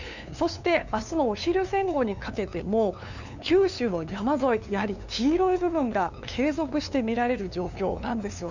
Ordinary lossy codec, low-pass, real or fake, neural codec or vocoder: none; 7.2 kHz; fake; codec, 16 kHz, 2 kbps, FunCodec, trained on LibriTTS, 25 frames a second